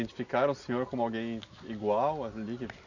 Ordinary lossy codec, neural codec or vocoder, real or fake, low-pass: none; none; real; 7.2 kHz